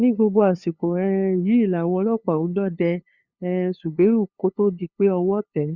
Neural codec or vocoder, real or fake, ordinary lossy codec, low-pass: codec, 16 kHz, 2 kbps, FunCodec, trained on LibriTTS, 25 frames a second; fake; Opus, 64 kbps; 7.2 kHz